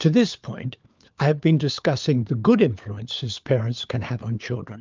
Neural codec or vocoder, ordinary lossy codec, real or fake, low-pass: codec, 16 kHz, 4 kbps, FunCodec, trained on LibriTTS, 50 frames a second; Opus, 24 kbps; fake; 7.2 kHz